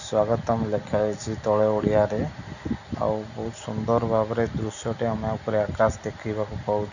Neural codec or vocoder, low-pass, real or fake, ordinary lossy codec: none; 7.2 kHz; real; none